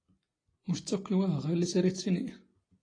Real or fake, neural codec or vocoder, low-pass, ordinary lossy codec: real; none; 9.9 kHz; AAC, 32 kbps